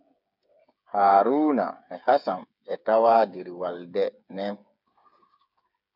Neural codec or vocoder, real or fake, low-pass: codec, 16 kHz, 8 kbps, FreqCodec, smaller model; fake; 5.4 kHz